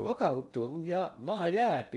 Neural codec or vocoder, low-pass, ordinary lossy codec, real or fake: codec, 16 kHz in and 24 kHz out, 0.8 kbps, FocalCodec, streaming, 65536 codes; 10.8 kHz; MP3, 64 kbps; fake